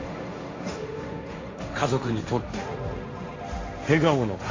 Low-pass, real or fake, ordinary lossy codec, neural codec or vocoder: 7.2 kHz; fake; AAC, 32 kbps; codec, 16 kHz, 1.1 kbps, Voila-Tokenizer